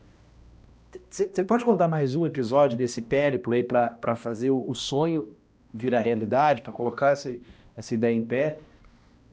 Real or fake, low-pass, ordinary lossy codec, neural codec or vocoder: fake; none; none; codec, 16 kHz, 1 kbps, X-Codec, HuBERT features, trained on balanced general audio